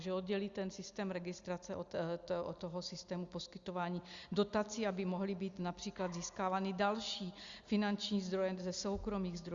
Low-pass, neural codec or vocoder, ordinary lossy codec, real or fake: 7.2 kHz; none; Opus, 64 kbps; real